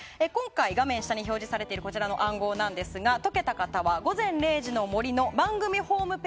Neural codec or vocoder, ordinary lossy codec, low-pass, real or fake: none; none; none; real